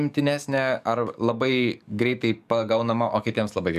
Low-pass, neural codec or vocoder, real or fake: 14.4 kHz; codec, 44.1 kHz, 7.8 kbps, DAC; fake